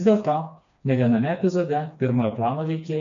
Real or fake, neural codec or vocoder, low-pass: fake; codec, 16 kHz, 2 kbps, FreqCodec, smaller model; 7.2 kHz